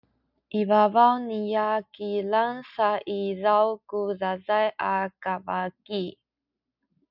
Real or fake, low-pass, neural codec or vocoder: real; 5.4 kHz; none